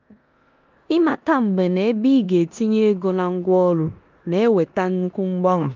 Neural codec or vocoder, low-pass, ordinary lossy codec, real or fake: codec, 16 kHz in and 24 kHz out, 0.9 kbps, LongCat-Audio-Codec, four codebook decoder; 7.2 kHz; Opus, 24 kbps; fake